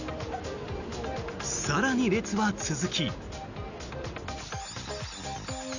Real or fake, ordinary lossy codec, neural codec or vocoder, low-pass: real; none; none; 7.2 kHz